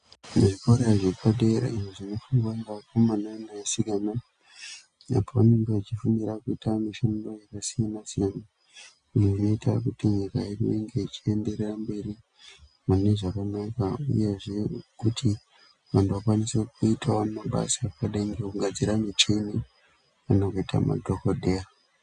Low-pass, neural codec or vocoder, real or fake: 9.9 kHz; none; real